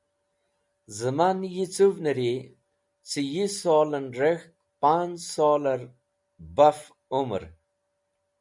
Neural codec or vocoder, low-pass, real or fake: none; 10.8 kHz; real